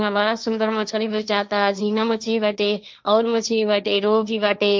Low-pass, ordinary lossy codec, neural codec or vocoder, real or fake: none; none; codec, 16 kHz, 1.1 kbps, Voila-Tokenizer; fake